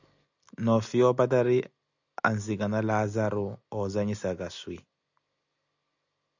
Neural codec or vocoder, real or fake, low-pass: none; real; 7.2 kHz